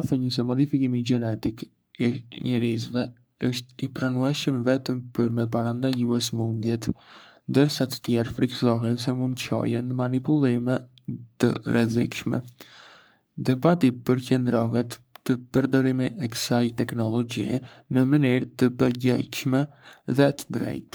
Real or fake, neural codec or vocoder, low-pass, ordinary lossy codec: fake; codec, 44.1 kHz, 3.4 kbps, Pupu-Codec; none; none